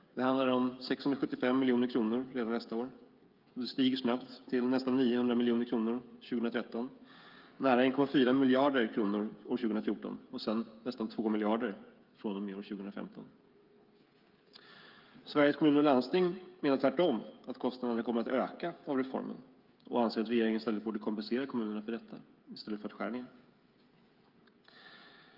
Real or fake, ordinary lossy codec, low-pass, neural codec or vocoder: fake; Opus, 24 kbps; 5.4 kHz; codec, 16 kHz, 16 kbps, FreqCodec, smaller model